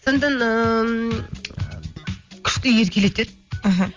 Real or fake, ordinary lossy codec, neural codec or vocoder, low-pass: real; Opus, 32 kbps; none; 7.2 kHz